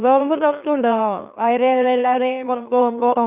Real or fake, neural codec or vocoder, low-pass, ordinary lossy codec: fake; autoencoder, 44.1 kHz, a latent of 192 numbers a frame, MeloTTS; 3.6 kHz; Opus, 64 kbps